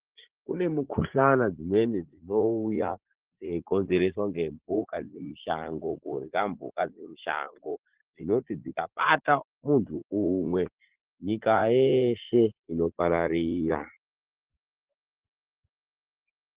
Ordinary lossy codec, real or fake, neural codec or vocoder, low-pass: Opus, 16 kbps; fake; vocoder, 44.1 kHz, 80 mel bands, Vocos; 3.6 kHz